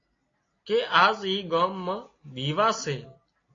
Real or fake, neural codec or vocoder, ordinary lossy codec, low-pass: real; none; AAC, 32 kbps; 7.2 kHz